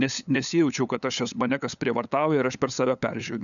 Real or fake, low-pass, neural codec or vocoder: fake; 7.2 kHz; codec, 16 kHz, 8 kbps, FunCodec, trained on LibriTTS, 25 frames a second